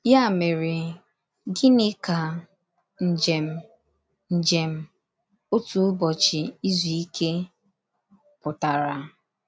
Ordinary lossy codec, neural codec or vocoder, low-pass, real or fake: none; none; none; real